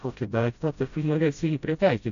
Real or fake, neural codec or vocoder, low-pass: fake; codec, 16 kHz, 0.5 kbps, FreqCodec, smaller model; 7.2 kHz